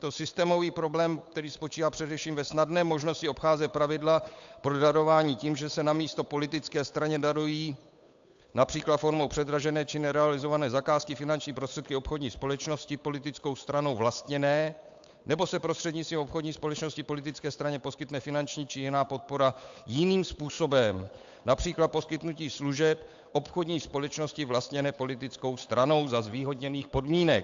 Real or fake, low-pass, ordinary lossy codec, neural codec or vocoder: fake; 7.2 kHz; AAC, 64 kbps; codec, 16 kHz, 8 kbps, FunCodec, trained on Chinese and English, 25 frames a second